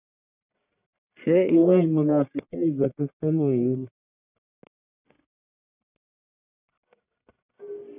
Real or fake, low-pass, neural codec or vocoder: fake; 3.6 kHz; codec, 44.1 kHz, 1.7 kbps, Pupu-Codec